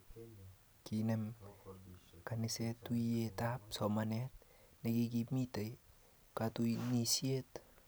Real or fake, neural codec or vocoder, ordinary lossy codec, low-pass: real; none; none; none